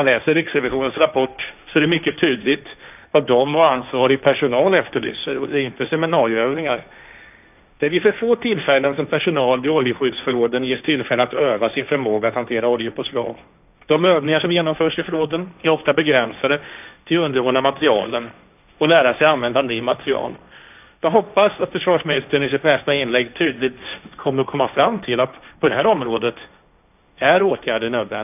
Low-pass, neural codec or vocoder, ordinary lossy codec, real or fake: 3.6 kHz; codec, 16 kHz, 1.1 kbps, Voila-Tokenizer; none; fake